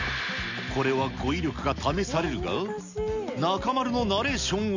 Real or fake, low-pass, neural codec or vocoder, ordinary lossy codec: real; 7.2 kHz; none; none